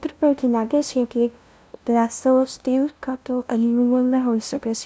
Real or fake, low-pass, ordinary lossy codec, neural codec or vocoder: fake; none; none; codec, 16 kHz, 0.5 kbps, FunCodec, trained on LibriTTS, 25 frames a second